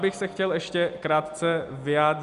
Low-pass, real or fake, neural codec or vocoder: 10.8 kHz; real; none